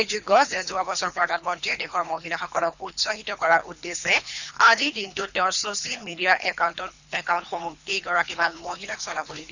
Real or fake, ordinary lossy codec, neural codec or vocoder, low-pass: fake; none; codec, 24 kHz, 3 kbps, HILCodec; 7.2 kHz